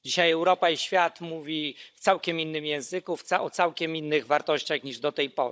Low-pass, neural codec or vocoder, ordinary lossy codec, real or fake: none; codec, 16 kHz, 16 kbps, FunCodec, trained on Chinese and English, 50 frames a second; none; fake